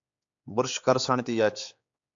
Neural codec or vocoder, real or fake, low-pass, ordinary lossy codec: codec, 16 kHz, 4 kbps, X-Codec, HuBERT features, trained on general audio; fake; 7.2 kHz; AAC, 64 kbps